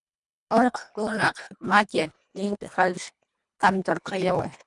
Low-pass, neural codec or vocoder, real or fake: 10.8 kHz; codec, 24 kHz, 1.5 kbps, HILCodec; fake